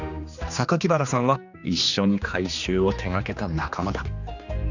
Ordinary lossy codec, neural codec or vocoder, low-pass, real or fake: none; codec, 16 kHz, 2 kbps, X-Codec, HuBERT features, trained on general audio; 7.2 kHz; fake